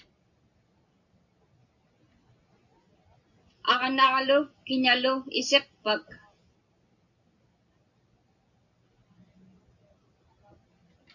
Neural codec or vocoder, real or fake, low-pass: none; real; 7.2 kHz